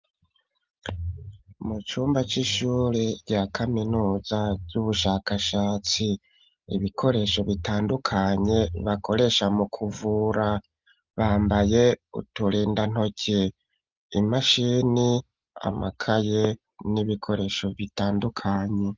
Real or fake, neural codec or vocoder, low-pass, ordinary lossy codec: real; none; 7.2 kHz; Opus, 24 kbps